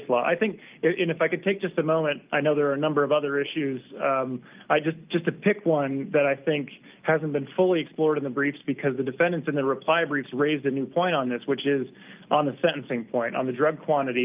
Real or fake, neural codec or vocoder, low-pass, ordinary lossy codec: real; none; 3.6 kHz; Opus, 24 kbps